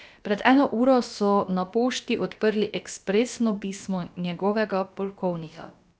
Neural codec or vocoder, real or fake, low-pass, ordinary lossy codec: codec, 16 kHz, about 1 kbps, DyCAST, with the encoder's durations; fake; none; none